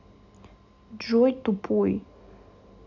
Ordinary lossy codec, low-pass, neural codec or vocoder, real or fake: none; 7.2 kHz; none; real